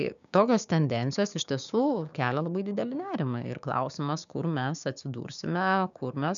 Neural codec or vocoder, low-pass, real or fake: codec, 16 kHz, 6 kbps, DAC; 7.2 kHz; fake